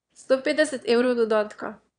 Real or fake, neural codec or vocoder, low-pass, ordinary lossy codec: fake; autoencoder, 22.05 kHz, a latent of 192 numbers a frame, VITS, trained on one speaker; 9.9 kHz; none